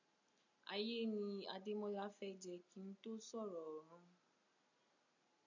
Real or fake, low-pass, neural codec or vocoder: real; 7.2 kHz; none